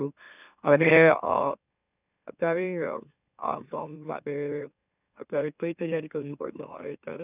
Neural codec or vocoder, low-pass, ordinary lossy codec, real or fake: autoencoder, 44.1 kHz, a latent of 192 numbers a frame, MeloTTS; 3.6 kHz; none; fake